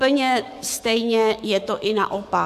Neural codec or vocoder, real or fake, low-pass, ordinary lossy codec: codec, 44.1 kHz, 7.8 kbps, DAC; fake; 14.4 kHz; MP3, 96 kbps